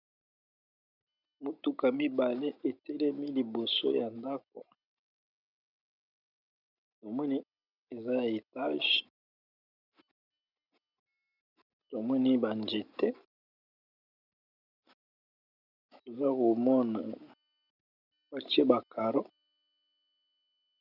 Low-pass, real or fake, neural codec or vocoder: 5.4 kHz; real; none